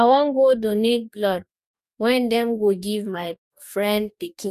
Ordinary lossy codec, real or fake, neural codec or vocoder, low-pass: none; fake; codec, 44.1 kHz, 2.6 kbps, DAC; 14.4 kHz